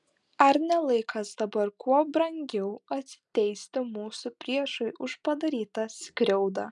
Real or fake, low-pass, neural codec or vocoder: real; 10.8 kHz; none